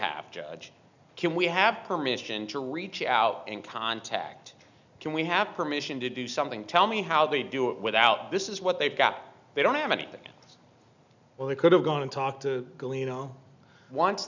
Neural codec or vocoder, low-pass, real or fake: none; 7.2 kHz; real